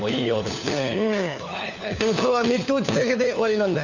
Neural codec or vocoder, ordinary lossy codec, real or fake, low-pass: codec, 16 kHz, 4 kbps, FunCodec, trained on LibriTTS, 50 frames a second; none; fake; 7.2 kHz